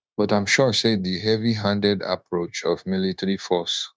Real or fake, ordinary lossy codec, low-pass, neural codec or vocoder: fake; none; none; codec, 16 kHz, 0.9 kbps, LongCat-Audio-Codec